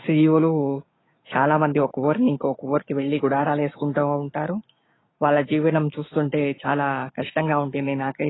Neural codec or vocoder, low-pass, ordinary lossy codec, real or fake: codec, 16 kHz, 6 kbps, DAC; 7.2 kHz; AAC, 16 kbps; fake